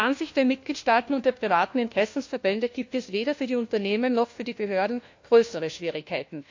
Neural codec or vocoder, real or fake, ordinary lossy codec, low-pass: codec, 16 kHz, 1 kbps, FunCodec, trained on LibriTTS, 50 frames a second; fake; AAC, 48 kbps; 7.2 kHz